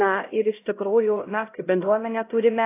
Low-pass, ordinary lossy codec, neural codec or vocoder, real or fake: 3.6 kHz; AAC, 24 kbps; codec, 16 kHz, 0.5 kbps, X-Codec, HuBERT features, trained on LibriSpeech; fake